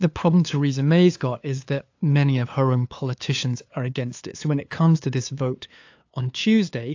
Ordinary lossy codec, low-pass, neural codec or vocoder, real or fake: AAC, 48 kbps; 7.2 kHz; codec, 16 kHz, 2 kbps, FunCodec, trained on LibriTTS, 25 frames a second; fake